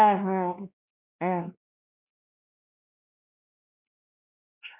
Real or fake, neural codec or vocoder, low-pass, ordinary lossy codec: fake; codec, 16 kHz, 1 kbps, X-Codec, WavLM features, trained on Multilingual LibriSpeech; 3.6 kHz; none